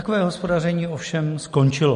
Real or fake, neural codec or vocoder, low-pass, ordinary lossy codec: real; none; 14.4 kHz; MP3, 48 kbps